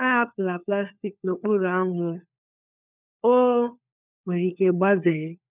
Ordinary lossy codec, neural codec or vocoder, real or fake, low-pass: none; codec, 16 kHz, 4 kbps, FunCodec, trained on LibriTTS, 50 frames a second; fake; 3.6 kHz